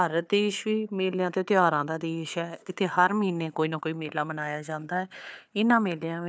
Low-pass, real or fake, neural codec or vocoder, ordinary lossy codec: none; fake; codec, 16 kHz, 4 kbps, FunCodec, trained on Chinese and English, 50 frames a second; none